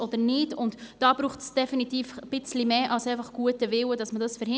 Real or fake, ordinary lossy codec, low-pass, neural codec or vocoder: real; none; none; none